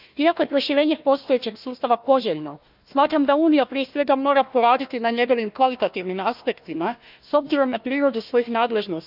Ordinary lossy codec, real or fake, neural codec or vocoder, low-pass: none; fake; codec, 16 kHz, 1 kbps, FunCodec, trained on Chinese and English, 50 frames a second; 5.4 kHz